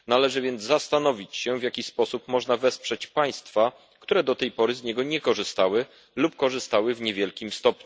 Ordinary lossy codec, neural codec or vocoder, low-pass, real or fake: none; none; none; real